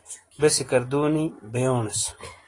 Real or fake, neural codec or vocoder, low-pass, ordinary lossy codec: real; none; 10.8 kHz; AAC, 32 kbps